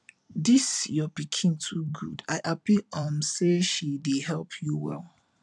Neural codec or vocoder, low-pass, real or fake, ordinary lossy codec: vocoder, 44.1 kHz, 128 mel bands every 256 samples, BigVGAN v2; 10.8 kHz; fake; none